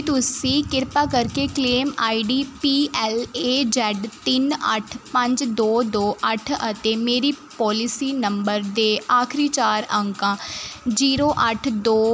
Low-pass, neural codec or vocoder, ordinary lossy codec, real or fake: none; none; none; real